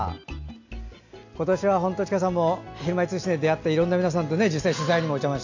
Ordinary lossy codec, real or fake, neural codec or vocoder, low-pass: AAC, 48 kbps; real; none; 7.2 kHz